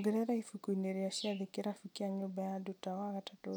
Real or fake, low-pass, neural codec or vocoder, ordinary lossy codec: real; none; none; none